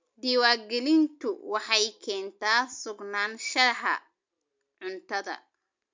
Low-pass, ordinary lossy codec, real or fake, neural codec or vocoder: 7.2 kHz; MP3, 64 kbps; real; none